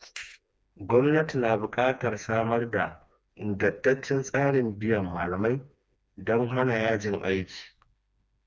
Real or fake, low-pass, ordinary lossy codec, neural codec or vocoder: fake; none; none; codec, 16 kHz, 2 kbps, FreqCodec, smaller model